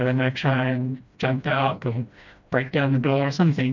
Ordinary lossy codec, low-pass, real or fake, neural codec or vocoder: MP3, 64 kbps; 7.2 kHz; fake; codec, 16 kHz, 1 kbps, FreqCodec, smaller model